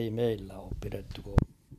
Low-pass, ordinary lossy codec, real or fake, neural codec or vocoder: 14.4 kHz; none; real; none